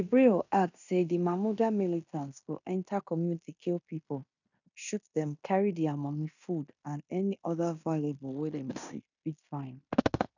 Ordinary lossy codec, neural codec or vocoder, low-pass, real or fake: none; codec, 16 kHz in and 24 kHz out, 0.9 kbps, LongCat-Audio-Codec, fine tuned four codebook decoder; 7.2 kHz; fake